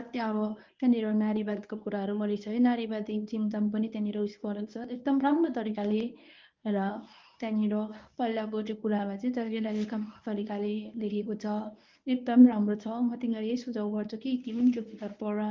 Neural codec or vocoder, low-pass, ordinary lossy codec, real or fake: codec, 24 kHz, 0.9 kbps, WavTokenizer, medium speech release version 1; 7.2 kHz; Opus, 24 kbps; fake